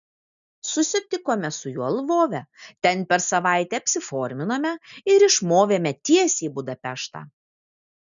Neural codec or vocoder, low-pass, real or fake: none; 7.2 kHz; real